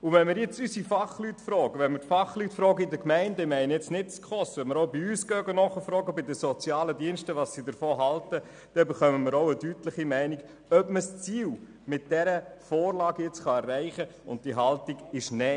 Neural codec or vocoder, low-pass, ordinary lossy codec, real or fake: none; 9.9 kHz; none; real